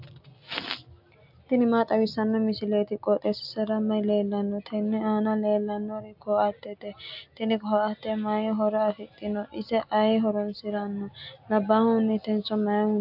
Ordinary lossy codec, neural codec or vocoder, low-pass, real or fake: AAC, 48 kbps; none; 5.4 kHz; real